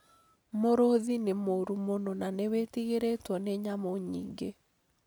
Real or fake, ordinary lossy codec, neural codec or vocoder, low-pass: real; none; none; none